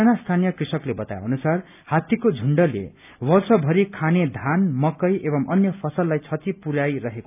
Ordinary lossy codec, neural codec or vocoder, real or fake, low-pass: none; none; real; 3.6 kHz